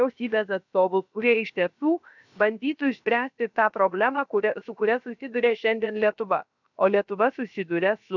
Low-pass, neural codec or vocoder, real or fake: 7.2 kHz; codec, 16 kHz, about 1 kbps, DyCAST, with the encoder's durations; fake